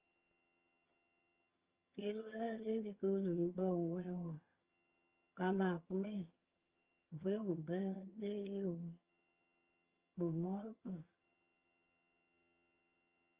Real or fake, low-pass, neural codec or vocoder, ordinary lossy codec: fake; 3.6 kHz; vocoder, 22.05 kHz, 80 mel bands, HiFi-GAN; Opus, 32 kbps